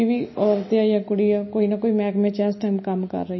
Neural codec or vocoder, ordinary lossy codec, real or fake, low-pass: none; MP3, 24 kbps; real; 7.2 kHz